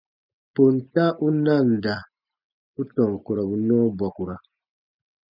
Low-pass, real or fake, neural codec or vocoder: 5.4 kHz; real; none